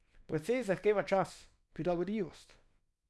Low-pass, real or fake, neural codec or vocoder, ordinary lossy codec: none; fake; codec, 24 kHz, 0.9 kbps, WavTokenizer, small release; none